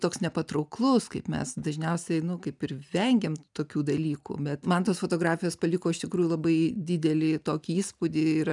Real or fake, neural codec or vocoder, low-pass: real; none; 10.8 kHz